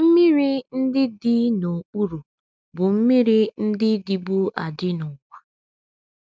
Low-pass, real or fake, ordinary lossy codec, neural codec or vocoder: none; real; none; none